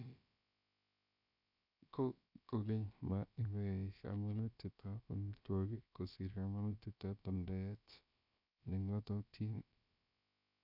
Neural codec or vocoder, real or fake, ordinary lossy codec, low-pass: codec, 16 kHz, about 1 kbps, DyCAST, with the encoder's durations; fake; none; 5.4 kHz